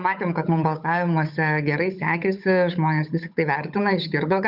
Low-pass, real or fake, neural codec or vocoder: 5.4 kHz; fake; codec, 16 kHz, 8 kbps, FunCodec, trained on Chinese and English, 25 frames a second